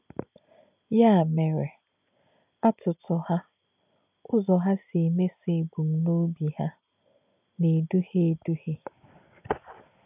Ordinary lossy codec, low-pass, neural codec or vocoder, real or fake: none; 3.6 kHz; none; real